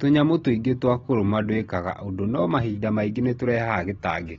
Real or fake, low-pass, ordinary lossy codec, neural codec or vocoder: real; 7.2 kHz; AAC, 24 kbps; none